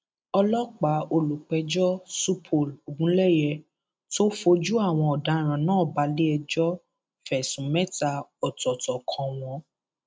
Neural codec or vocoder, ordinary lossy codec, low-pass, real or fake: none; none; none; real